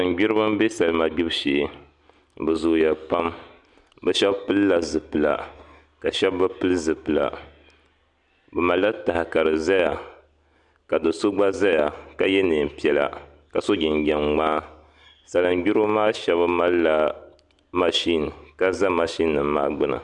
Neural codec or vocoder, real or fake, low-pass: none; real; 10.8 kHz